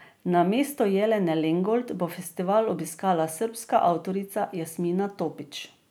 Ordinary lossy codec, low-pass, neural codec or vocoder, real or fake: none; none; none; real